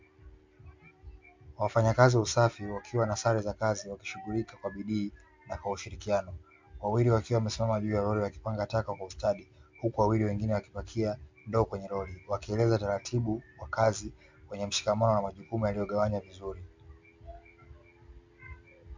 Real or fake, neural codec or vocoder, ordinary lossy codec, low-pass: real; none; MP3, 64 kbps; 7.2 kHz